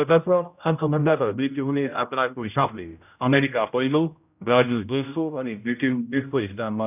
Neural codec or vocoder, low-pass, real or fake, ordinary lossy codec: codec, 16 kHz, 0.5 kbps, X-Codec, HuBERT features, trained on general audio; 3.6 kHz; fake; none